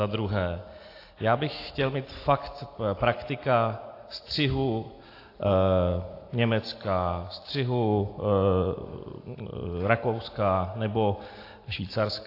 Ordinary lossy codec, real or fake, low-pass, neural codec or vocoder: AAC, 32 kbps; real; 5.4 kHz; none